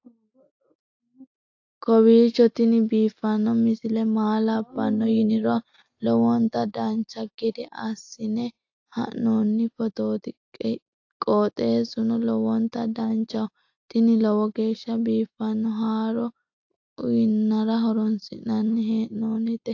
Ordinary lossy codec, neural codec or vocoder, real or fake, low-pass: AAC, 48 kbps; none; real; 7.2 kHz